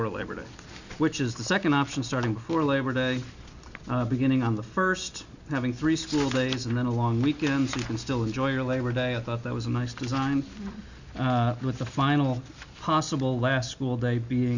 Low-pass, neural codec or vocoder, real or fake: 7.2 kHz; none; real